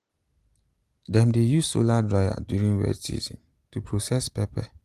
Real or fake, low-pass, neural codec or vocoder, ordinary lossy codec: real; 14.4 kHz; none; Opus, 24 kbps